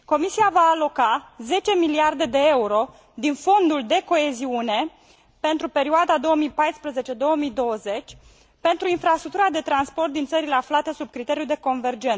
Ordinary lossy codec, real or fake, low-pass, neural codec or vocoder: none; real; none; none